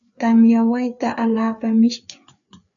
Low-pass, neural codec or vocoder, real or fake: 7.2 kHz; codec, 16 kHz, 4 kbps, FreqCodec, larger model; fake